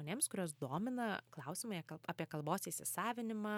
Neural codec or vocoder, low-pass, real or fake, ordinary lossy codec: none; 19.8 kHz; real; MP3, 96 kbps